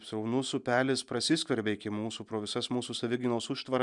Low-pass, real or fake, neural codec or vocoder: 10.8 kHz; real; none